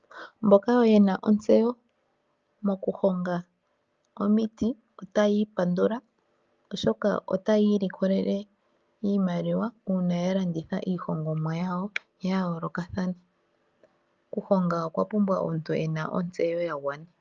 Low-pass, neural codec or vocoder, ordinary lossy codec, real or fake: 7.2 kHz; none; Opus, 24 kbps; real